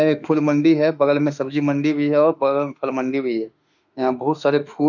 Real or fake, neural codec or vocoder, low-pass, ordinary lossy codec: fake; autoencoder, 48 kHz, 32 numbers a frame, DAC-VAE, trained on Japanese speech; 7.2 kHz; none